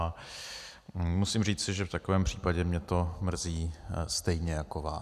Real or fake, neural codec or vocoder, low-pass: real; none; 14.4 kHz